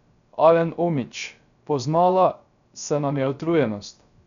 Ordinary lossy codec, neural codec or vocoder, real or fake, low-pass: none; codec, 16 kHz, 0.3 kbps, FocalCodec; fake; 7.2 kHz